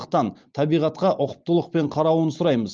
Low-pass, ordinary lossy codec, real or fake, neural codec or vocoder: 7.2 kHz; Opus, 24 kbps; real; none